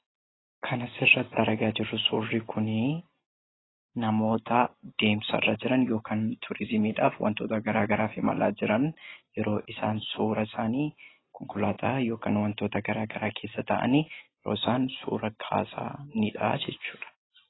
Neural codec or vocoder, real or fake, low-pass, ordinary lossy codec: none; real; 7.2 kHz; AAC, 16 kbps